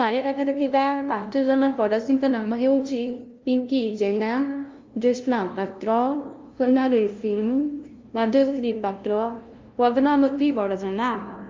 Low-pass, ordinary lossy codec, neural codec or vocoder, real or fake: 7.2 kHz; Opus, 24 kbps; codec, 16 kHz, 0.5 kbps, FunCodec, trained on LibriTTS, 25 frames a second; fake